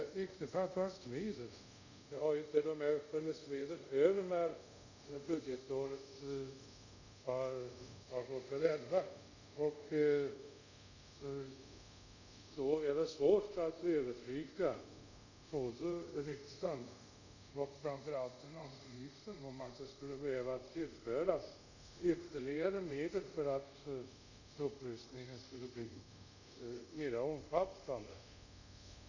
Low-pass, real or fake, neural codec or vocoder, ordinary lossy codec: 7.2 kHz; fake; codec, 24 kHz, 0.5 kbps, DualCodec; none